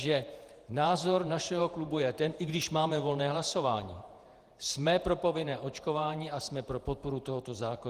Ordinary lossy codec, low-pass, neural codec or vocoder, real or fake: Opus, 24 kbps; 14.4 kHz; vocoder, 48 kHz, 128 mel bands, Vocos; fake